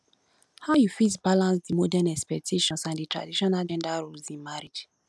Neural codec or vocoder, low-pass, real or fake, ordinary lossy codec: none; none; real; none